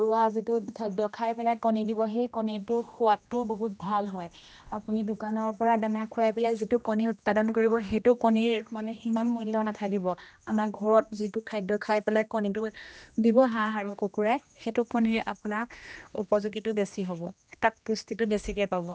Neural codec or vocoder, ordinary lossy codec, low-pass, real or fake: codec, 16 kHz, 1 kbps, X-Codec, HuBERT features, trained on general audio; none; none; fake